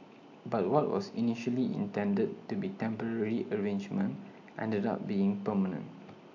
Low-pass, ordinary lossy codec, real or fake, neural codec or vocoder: 7.2 kHz; AAC, 48 kbps; real; none